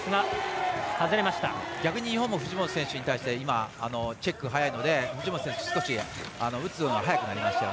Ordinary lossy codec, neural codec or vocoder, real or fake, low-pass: none; none; real; none